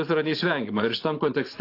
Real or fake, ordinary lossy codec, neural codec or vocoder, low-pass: fake; AAC, 32 kbps; vocoder, 44.1 kHz, 128 mel bands every 512 samples, BigVGAN v2; 5.4 kHz